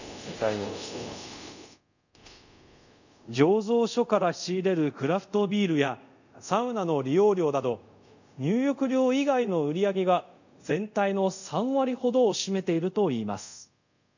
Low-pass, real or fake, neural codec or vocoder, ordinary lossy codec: 7.2 kHz; fake; codec, 24 kHz, 0.5 kbps, DualCodec; none